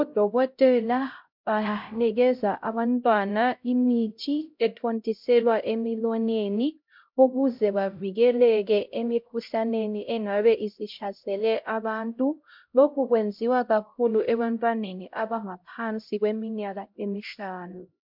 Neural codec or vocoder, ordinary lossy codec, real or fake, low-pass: codec, 16 kHz, 0.5 kbps, X-Codec, HuBERT features, trained on LibriSpeech; MP3, 48 kbps; fake; 5.4 kHz